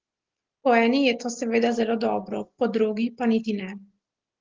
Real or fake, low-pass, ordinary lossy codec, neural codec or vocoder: real; 7.2 kHz; Opus, 16 kbps; none